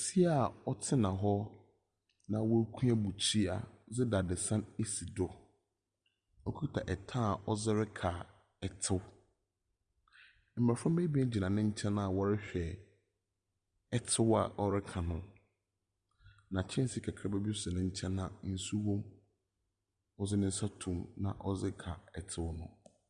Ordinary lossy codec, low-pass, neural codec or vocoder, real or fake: Opus, 64 kbps; 9.9 kHz; none; real